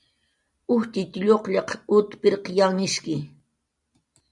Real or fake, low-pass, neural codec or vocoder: real; 10.8 kHz; none